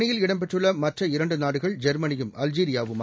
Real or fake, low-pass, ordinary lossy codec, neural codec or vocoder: real; none; none; none